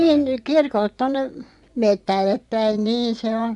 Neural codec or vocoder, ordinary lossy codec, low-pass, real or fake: vocoder, 24 kHz, 100 mel bands, Vocos; none; 10.8 kHz; fake